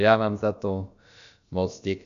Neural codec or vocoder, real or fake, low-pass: codec, 16 kHz, about 1 kbps, DyCAST, with the encoder's durations; fake; 7.2 kHz